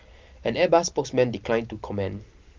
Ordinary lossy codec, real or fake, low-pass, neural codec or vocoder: Opus, 24 kbps; real; 7.2 kHz; none